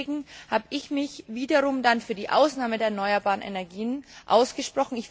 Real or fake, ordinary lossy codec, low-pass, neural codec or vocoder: real; none; none; none